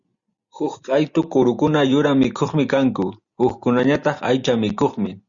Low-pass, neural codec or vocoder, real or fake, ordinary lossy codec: 7.2 kHz; none; real; Opus, 64 kbps